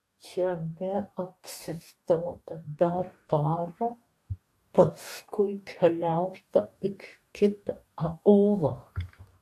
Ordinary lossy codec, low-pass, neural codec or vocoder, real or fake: AAC, 64 kbps; 14.4 kHz; codec, 44.1 kHz, 2.6 kbps, DAC; fake